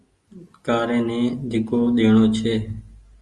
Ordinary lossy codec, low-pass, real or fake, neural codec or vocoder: Opus, 32 kbps; 10.8 kHz; real; none